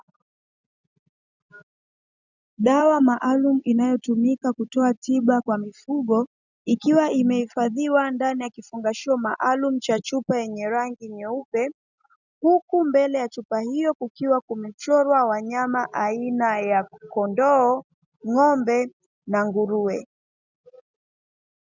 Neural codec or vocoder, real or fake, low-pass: none; real; 7.2 kHz